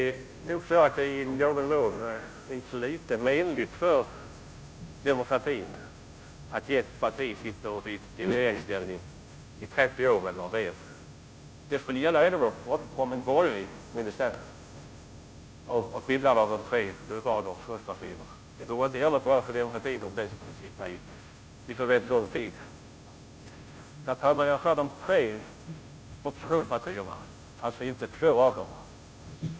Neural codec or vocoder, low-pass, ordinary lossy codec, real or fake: codec, 16 kHz, 0.5 kbps, FunCodec, trained on Chinese and English, 25 frames a second; none; none; fake